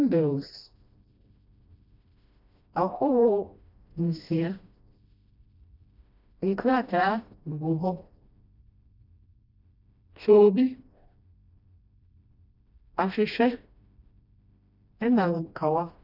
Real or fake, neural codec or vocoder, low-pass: fake; codec, 16 kHz, 1 kbps, FreqCodec, smaller model; 5.4 kHz